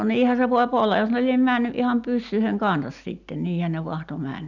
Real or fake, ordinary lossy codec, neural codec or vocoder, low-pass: real; none; none; 7.2 kHz